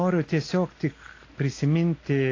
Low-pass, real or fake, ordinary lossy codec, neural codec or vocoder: 7.2 kHz; real; AAC, 32 kbps; none